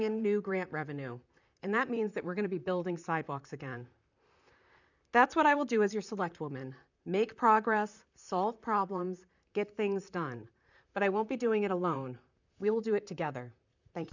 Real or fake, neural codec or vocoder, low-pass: fake; vocoder, 44.1 kHz, 128 mel bands, Pupu-Vocoder; 7.2 kHz